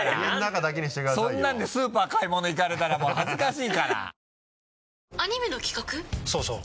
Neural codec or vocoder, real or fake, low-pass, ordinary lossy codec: none; real; none; none